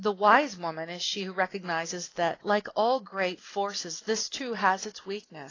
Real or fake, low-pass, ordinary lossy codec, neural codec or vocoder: real; 7.2 kHz; AAC, 32 kbps; none